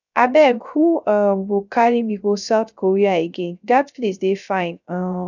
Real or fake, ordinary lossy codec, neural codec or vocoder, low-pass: fake; none; codec, 16 kHz, 0.3 kbps, FocalCodec; 7.2 kHz